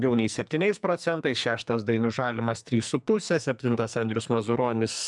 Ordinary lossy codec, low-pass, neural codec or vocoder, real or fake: MP3, 96 kbps; 10.8 kHz; codec, 44.1 kHz, 2.6 kbps, SNAC; fake